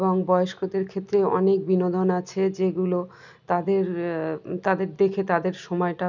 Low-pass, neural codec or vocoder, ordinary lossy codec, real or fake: 7.2 kHz; none; none; real